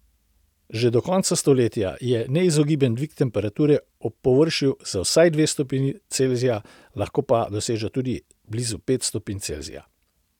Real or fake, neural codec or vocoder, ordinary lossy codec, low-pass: real; none; none; 19.8 kHz